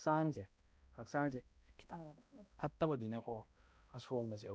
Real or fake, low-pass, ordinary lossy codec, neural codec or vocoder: fake; none; none; codec, 16 kHz, 0.5 kbps, X-Codec, HuBERT features, trained on balanced general audio